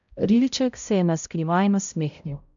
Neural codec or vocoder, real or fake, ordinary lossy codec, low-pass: codec, 16 kHz, 0.5 kbps, X-Codec, HuBERT features, trained on balanced general audio; fake; none; 7.2 kHz